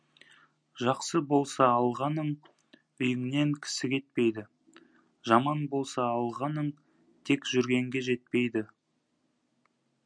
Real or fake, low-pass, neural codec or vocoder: real; 9.9 kHz; none